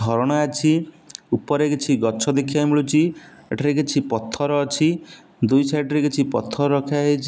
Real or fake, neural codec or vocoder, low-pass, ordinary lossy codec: real; none; none; none